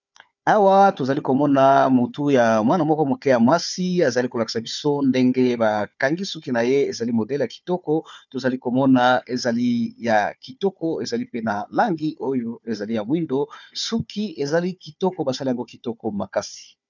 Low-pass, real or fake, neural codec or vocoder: 7.2 kHz; fake; codec, 16 kHz, 4 kbps, FunCodec, trained on Chinese and English, 50 frames a second